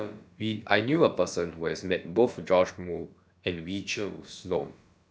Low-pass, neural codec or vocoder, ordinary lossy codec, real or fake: none; codec, 16 kHz, about 1 kbps, DyCAST, with the encoder's durations; none; fake